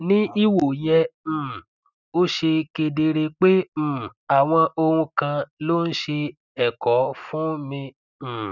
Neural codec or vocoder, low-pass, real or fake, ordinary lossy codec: none; 7.2 kHz; real; none